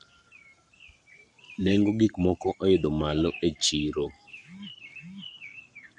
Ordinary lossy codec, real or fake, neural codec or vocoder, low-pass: none; fake; codec, 44.1 kHz, 7.8 kbps, Pupu-Codec; 10.8 kHz